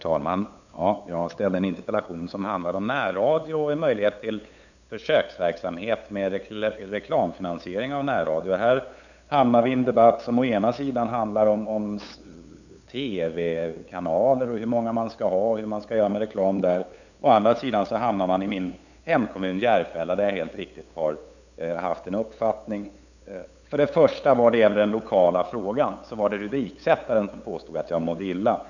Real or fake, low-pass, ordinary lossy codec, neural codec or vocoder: fake; 7.2 kHz; none; codec, 16 kHz, 8 kbps, FunCodec, trained on LibriTTS, 25 frames a second